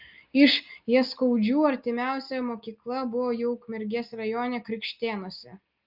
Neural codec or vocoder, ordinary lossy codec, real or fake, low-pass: none; Opus, 24 kbps; real; 5.4 kHz